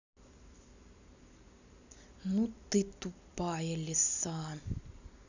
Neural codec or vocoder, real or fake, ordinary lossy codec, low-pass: none; real; none; 7.2 kHz